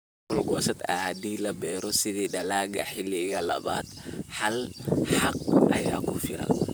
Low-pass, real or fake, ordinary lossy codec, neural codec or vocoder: none; fake; none; vocoder, 44.1 kHz, 128 mel bands, Pupu-Vocoder